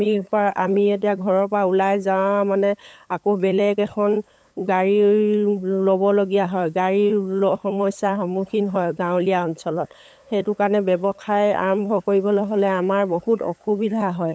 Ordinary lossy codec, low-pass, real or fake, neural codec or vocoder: none; none; fake; codec, 16 kHz, 8 kbps, FunCodec, trained on LibriTTS, 25 frames a second